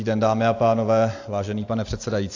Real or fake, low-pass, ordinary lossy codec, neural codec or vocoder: real; 7.2 kHz; AAC, 48 kbps; none